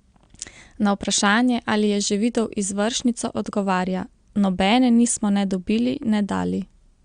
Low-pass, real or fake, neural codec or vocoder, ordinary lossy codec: 9.9 kHz; real; none; Opus, 64 kbps